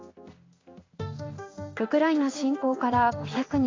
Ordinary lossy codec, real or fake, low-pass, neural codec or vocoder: AAC, 32 kbps; fake; 7.2 kHz; codec, 16 kHz in and 24 kHz out, 1 kbps, XY-Tokenizer